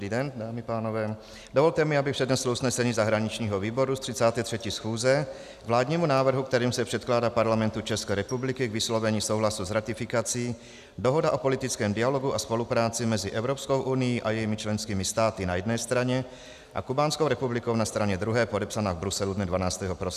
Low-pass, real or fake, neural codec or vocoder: 14.4 kHz; real; none